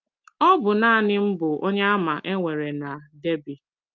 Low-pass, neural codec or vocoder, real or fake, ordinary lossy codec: 7.2 kHz; none; real; Opus, 32 kbps